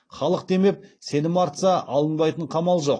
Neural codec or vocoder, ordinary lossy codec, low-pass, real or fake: none; AAC, 32 kbps; 9.9 kHz; real